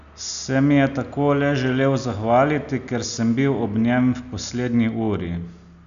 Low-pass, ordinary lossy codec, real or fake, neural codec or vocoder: 7.2 kHz; none; real; none